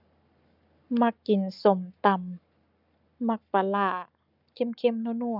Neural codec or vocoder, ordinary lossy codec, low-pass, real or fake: codec, 44.1 kHz, 7.8 kbps, Pupu-Codec; none; 5.4 kHz; fake